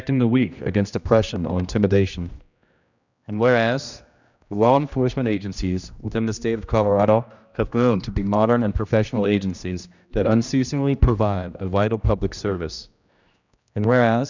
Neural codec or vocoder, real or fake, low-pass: codec, 16 kHz, 1 kbps, X-Codec, HuBERT features, trained on general audio; fake; 7.2 kHz